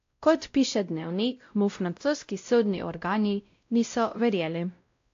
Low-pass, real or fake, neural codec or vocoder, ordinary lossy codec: 7.2 kHz; fake; codec, 16 kHz, 0.5 kbps, X-Codec, WavLM features, trained on Multilingual LibriSpeech; AAC, 48 kbps